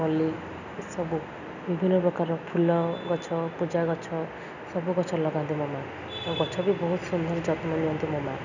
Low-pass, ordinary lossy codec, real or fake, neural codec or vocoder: 7.2 kHz; none; real; none